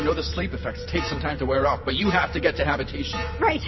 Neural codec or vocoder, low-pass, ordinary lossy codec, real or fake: none; 7.2 kHz; MP3, 24 kbps; real